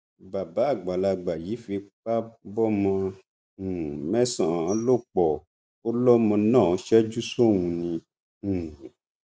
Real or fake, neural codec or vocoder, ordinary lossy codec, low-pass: real; none; none; none